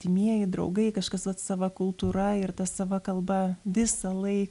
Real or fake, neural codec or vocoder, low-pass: real; none; 10.8 kHz